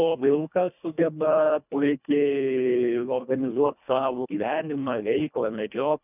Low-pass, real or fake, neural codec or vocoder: 3.6 kHz; fake; codec, 24 kHz, 1.5 kbps, HILCodec